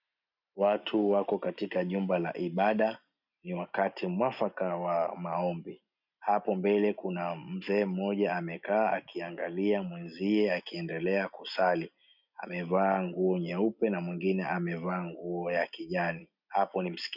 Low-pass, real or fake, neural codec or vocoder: 5.4 kHz; real; none